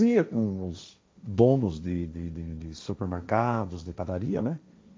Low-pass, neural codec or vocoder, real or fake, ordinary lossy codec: none; codec, 16 kHz, 1.1 kbps, Voila-Tokenizer; fake; none